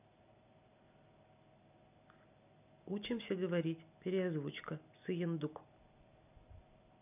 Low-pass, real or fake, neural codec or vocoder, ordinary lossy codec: 3.6 kHz; real; none; none